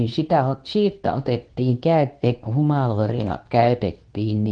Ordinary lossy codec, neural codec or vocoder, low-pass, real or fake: Opus, 24 kbps; codec, 24 kHz, 0.9 kbps, WavTokenizer, medium speech release version 2; 9.9 kHz; fake